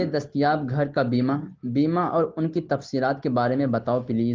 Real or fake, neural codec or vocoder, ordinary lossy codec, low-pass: real; none; Opus, 16 kbps; 7.2 kHz